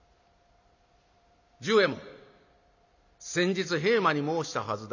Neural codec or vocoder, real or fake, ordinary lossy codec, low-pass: none; real; none; 7.2 kHz